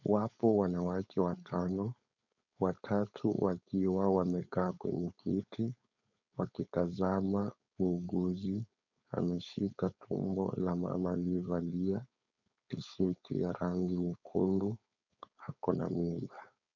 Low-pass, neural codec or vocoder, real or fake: 7.2 kHz; codec, 16 kHz, 4.8 kbps, FACodec; fake